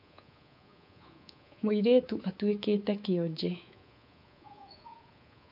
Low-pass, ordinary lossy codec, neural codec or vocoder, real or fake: 5.4 kHz; none; codec, 24 kHz, 3.1 kbps, DualCodec; fake